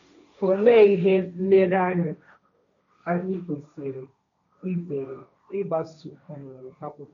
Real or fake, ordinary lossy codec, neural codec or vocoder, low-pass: fake; none; codec, 16 kHz, 1.1 kbps, Voila-Tokenizer; 7.2 kHz